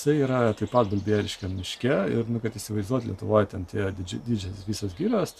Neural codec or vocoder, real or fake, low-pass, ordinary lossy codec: none; real; 14.4 kHz; MP3, 96 kbps